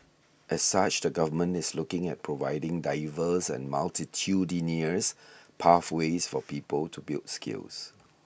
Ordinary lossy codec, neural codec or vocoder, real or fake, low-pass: none; none; real; none